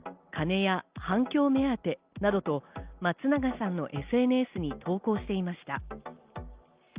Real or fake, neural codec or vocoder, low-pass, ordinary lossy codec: real; none; 3.6 kHz; Opus, 32 kbps